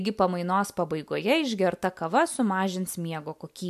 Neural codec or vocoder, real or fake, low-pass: none; real; 14.4 kHz